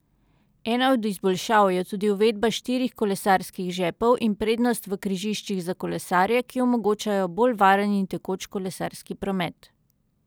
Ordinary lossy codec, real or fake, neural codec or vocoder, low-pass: none; real; none; none